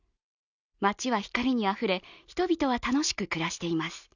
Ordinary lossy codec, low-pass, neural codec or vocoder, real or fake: none; 7.2 kHz; none; real